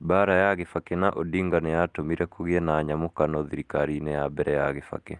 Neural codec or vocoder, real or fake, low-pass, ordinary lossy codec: none; real; 10.8 kHz; Opus, 24 kbps